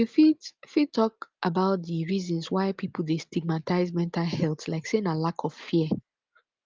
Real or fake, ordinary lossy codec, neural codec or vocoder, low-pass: real; Opus, 24 kbps; none; 7.2 kHz